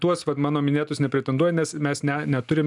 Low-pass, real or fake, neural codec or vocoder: 10.8 kHz; real; none